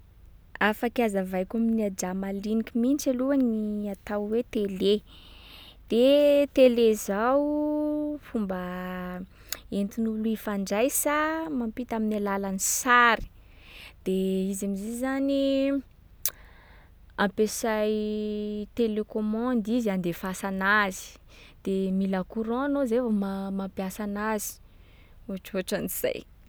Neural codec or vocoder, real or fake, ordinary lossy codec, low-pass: none; real; none; none